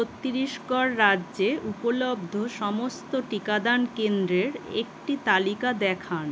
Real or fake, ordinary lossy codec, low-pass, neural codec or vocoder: real; none; none; none